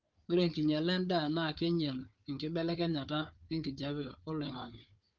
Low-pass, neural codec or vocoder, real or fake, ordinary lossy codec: 7.2 kHz; codec, 16 kHz, 4 kbps, FunCodec, trained on Chinese and English, 50 frames a second; fake; Opus, 24 kbps